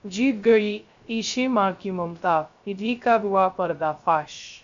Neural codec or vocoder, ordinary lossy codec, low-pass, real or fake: codec, 16 kHz, 0.3 kbps, FocalCodec; MP3, 64 kbps; 7.2 kHz; fake